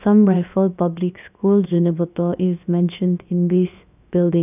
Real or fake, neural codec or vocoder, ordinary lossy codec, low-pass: fake; codec, 16 kHz, about 1 kbps, DyCAST, with the encoder's durations; none; 3.6 kHz